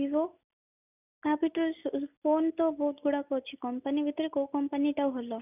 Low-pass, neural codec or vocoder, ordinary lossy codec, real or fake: 3.6 kHz; none; none; real